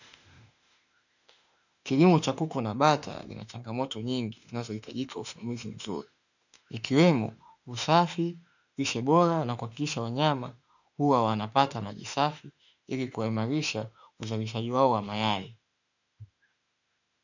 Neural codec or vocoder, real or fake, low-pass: autoencoder, 48 kHz, 32 numbers a frame, DAC-VAE, trained on Japanese speech; fake; 7.2 kHz